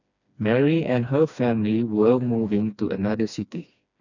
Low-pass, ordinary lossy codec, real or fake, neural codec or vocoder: 7.2 kHz; none; fake; codec, 16 kHz, 2 kbps, FreqCodec, smaller model